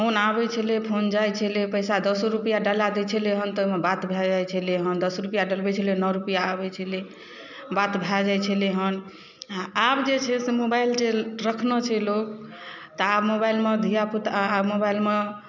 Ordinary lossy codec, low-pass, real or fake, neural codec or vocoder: none; 7.2 kHz; real; none